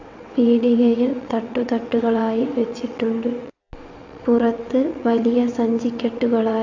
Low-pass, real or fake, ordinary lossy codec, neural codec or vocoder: 7.2 kHz; fake; AAC, 48 kbps; vocoder, 22.05 kHz, 80 mel bands, WaveNeXt